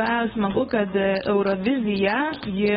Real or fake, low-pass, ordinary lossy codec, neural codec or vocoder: fake; 7.2 kHz; AAC, 16 kbps; codec, 16 kHz, 4.8 kbps, FACodec